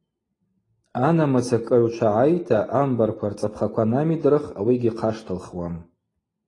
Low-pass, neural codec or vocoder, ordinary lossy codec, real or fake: 10.8 kHz; none; AAC, 32 kbps; real